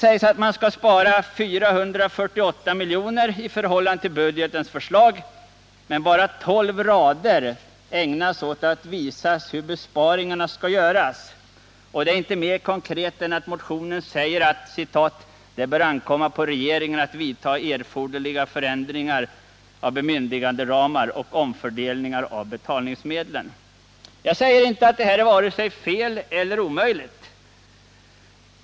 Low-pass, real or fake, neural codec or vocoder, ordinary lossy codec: none; real; none; none